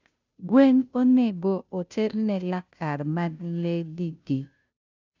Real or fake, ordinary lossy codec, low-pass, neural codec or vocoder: fake; none; 7.2 kHz; codec, 16 kHz, 0.5 kbps, FunCodec, trained on Chinese and English, 25 frames a second